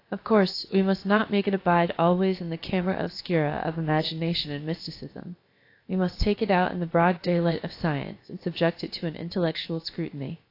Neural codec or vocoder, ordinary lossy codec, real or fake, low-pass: codec, 16 kHz, about 1 kbps, DyCAST, with the encoder's durations; AAC, 32 kbps; fake; 5.4 kHz